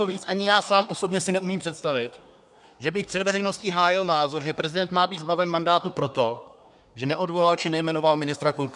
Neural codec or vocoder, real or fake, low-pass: codec, 24 kHz, 1 kbps, SNAC; fake; 10.8 kHz